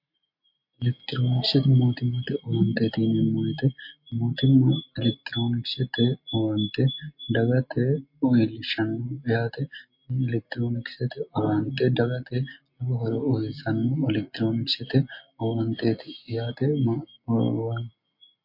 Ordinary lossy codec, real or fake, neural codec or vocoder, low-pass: MP3, 32 kbps; real; none; 5.4 kHz